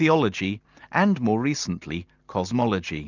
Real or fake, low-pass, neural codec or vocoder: real; 7.2 kHz; none